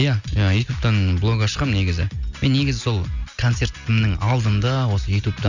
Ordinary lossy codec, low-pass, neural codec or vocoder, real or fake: none; 7.2 kHz; none; real